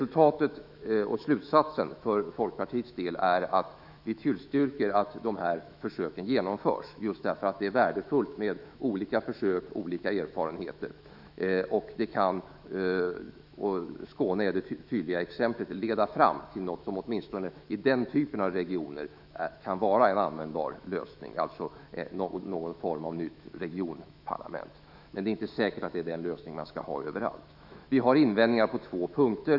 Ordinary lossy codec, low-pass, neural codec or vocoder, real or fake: none; 5.4 kHz; autoencoder, 48 kHz, 128 numbers a frame, DAC-VAE, trained on Japanese speech; fake